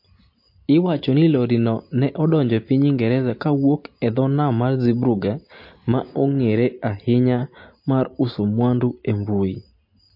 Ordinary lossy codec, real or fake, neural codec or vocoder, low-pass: MP3, 32 kbps; real; none; 5.4 kHz